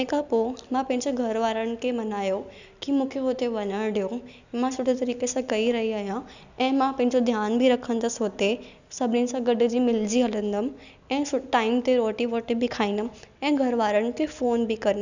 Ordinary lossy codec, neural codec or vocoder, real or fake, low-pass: none; none; real; 7.2 kHz